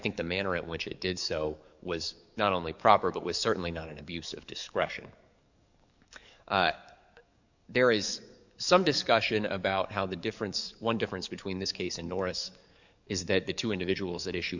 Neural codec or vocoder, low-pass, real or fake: codec, 44.1 kHz, 7.8 kbps, DAC; 7.2 kHz; fake